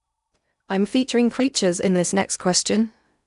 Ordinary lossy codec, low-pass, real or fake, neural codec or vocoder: none; 10.8 kHz; fake; codec, 16 kHz in and 24 kHz out, 0.8 kbps, FocalCodec, streaming, 65536 codes